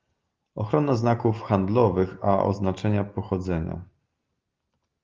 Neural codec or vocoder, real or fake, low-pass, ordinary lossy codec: none; real; 7.2 kHz; Opus, 24 kbps